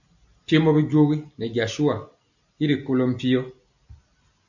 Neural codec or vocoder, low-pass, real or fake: none; 7.2 kHz; real